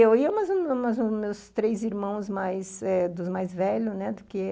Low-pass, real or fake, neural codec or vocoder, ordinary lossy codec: none; real; none; none